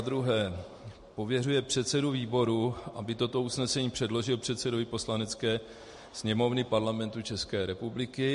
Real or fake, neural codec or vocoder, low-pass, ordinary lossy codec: real; none; 14.4 kHz; MP3, 48 kbps